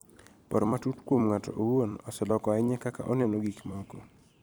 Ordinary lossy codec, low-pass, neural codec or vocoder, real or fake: none; none; vocoder, 44.1 kHz, 128 mel bands every 256 samples, BigVGAN v2; fake